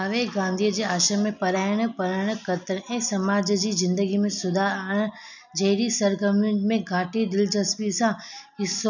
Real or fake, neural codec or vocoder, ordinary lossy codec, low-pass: real; none; none; 7.2 kHz